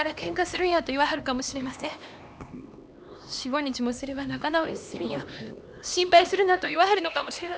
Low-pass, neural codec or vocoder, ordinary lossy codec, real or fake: none; codec, 16 kHz, 2 kbps, X-Codec, HuBERT features, trained on LibriSpeech; none; fake